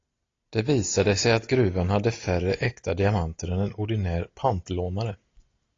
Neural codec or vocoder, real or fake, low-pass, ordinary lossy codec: none; real; 7.2 kHz; AAC, 32 kbps